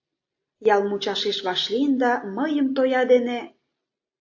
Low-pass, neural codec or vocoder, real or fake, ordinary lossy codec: 7.2 kHz; none; real; AAC, 48 kbps